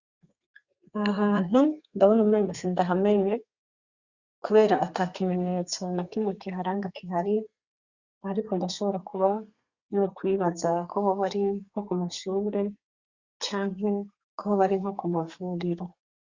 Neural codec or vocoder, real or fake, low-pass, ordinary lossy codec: codec, 32 kHz, 1.9 kbps, SNAC; fake; 7.2 kHz; Opus, 64 kbps